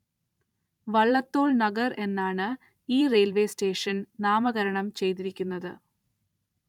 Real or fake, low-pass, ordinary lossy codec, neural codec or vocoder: fake; 19.8 kHz; none; vocoder, 44.1 kHz, 128 mel bands every 512 samples, BigVGAN v2